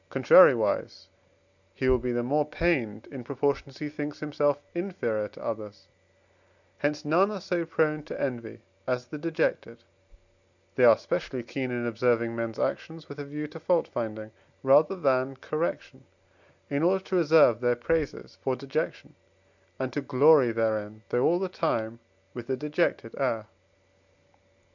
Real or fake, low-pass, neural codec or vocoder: real; 7.2 kHz; none